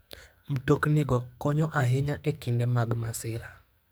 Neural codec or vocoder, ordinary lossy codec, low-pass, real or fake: codec, 44.1 kHz, 2.6 kbps, SNAC; none; none; fake